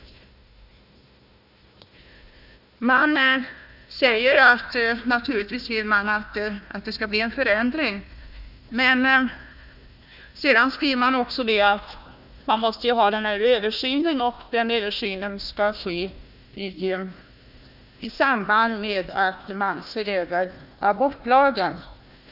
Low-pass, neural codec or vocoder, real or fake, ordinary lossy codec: 5.4 kHz; codec, 16 kHz, 1 kbps, FunCodec, trained on Chinese and English, 50 frames a second; fake; none